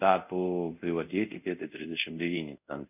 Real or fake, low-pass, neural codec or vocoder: fake; 3.6 kHz; codec, 24 kHz, 0.5 kbps, DualCodec